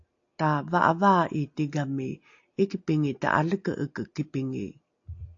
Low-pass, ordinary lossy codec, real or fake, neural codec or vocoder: 7.2 kHz; AAC, 48 kbps; real; none